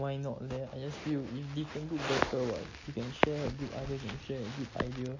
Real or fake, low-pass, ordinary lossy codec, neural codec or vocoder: real; 7.2 kHz; MP3, 32 kbps; none